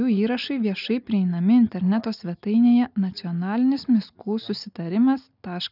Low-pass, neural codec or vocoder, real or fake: 5.4 kHz; none; real